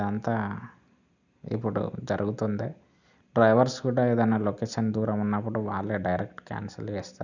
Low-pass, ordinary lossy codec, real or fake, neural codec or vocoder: 7.2 kHz; none; real; none